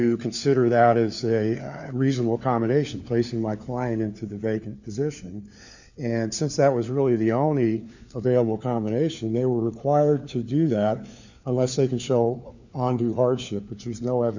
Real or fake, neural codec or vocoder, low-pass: fake; codec, 16 kHz, 4 kbps, FunCodec, trained on LibriTTS, 50 frames a second; 7.2 kHz